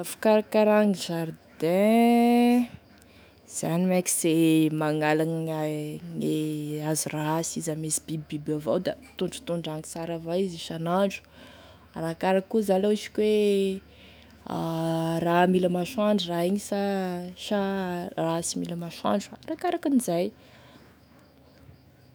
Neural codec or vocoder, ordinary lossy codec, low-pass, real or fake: autoencoder, 48 kHz, 128 numbers a frame, DAC-VAE, trained on Japanese speech; none; none; fake